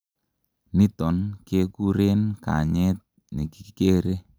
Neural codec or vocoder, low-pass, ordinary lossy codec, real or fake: none; none; none; real